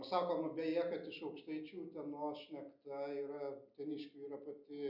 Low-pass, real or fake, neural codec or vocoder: 5.4 kHz; real; none